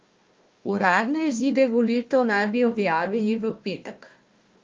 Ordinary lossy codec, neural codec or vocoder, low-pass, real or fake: Opus, 32 kbps; codec, 16 kHz, 1 kbps, FunCodec, trained on Chinese and English, 50 frames a second; 7.2 kHz; fake